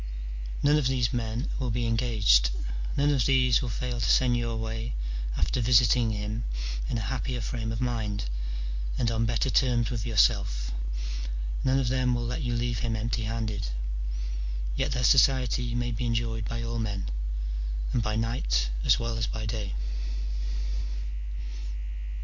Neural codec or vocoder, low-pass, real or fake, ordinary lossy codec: none; 7.2 kHz; real; MP3, 48 kbps